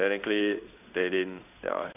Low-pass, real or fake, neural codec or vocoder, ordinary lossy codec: 3.6 kHz; fake; codec, 16 kHz in and 24 kHz out, 1 kbps, XY-Tokenizer; none